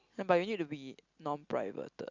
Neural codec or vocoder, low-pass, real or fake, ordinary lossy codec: none; 7.2 kHz; real; Opus, 64 kbps